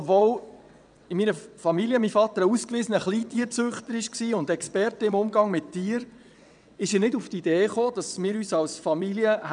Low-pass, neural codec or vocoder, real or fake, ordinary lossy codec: 9.9 kHz; vocoder, 22.05 kHz, 80 mel bands, WaveNeXt; fake; none